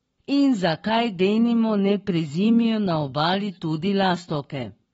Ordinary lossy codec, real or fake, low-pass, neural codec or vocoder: AAC, 24 kbps; fake; 19.8 kHz; codec, 44.1 kHz, 7.8 kbps, Pupu-Codec